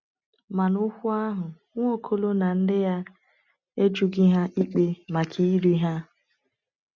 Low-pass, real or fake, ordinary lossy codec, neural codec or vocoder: 7.2 kHz; real; none; none